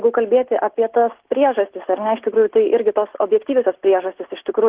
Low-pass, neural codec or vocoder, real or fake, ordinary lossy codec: 3.6 kHz; none; real; Opus, 16 kbps